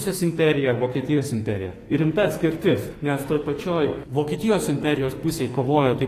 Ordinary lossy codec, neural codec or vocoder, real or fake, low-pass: AAC, 48 kbps; codec, 44.1 kHz, 2.6 kbps, SNAC; fake; 14.4 kHz